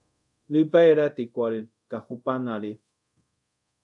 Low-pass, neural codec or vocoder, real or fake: 10.8 kHz; codec, 24 kHz, 0.5 kbps, DualCodec; fake